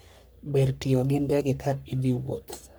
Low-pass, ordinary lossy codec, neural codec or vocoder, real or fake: none; none; codec, 44.1 kHz, 3.4 kbps, Pupu-Codec; fake